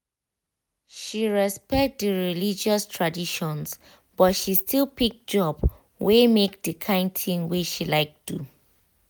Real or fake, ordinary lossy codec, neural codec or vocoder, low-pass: real; none; none; none